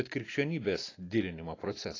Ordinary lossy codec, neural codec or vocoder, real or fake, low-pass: AAC, 32 kbps; none; real; 7.2 kHz